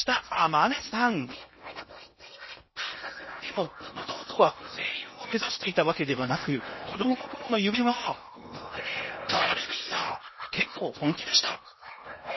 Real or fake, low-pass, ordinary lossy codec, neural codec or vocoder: fake; 7.2 kHz; MP3, 24 kbps; codec, 16 kHz in and 24 kHz out, 0.8 kbps, FocalCodec, streaming, 65536 codes